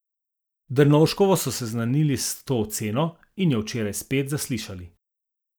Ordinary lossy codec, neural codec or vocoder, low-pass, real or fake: none; none; none; real